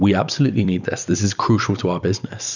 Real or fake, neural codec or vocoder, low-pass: fake; vocoder, 44.1 kHz, 128 mel bands every 256 samples, BigVGAN v2; 7.2 kHz